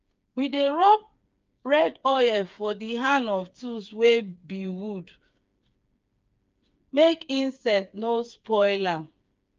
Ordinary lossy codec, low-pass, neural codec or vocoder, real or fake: Opus, 24 kbps; 7.2 kHz; codec, 16 kHz, 4 kbps, FreqCodec, smaller model; fake